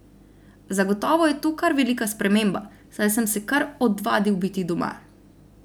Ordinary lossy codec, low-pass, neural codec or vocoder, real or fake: none; none; none; real